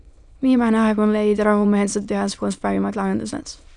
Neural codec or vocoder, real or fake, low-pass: autoencoder, 22.05 kHz, a latent of 192 numbers a frame, VITS, trained on many speakers; fake; 9.9 kHz